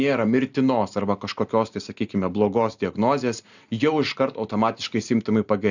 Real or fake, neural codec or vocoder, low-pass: real; none; 7.2 kHz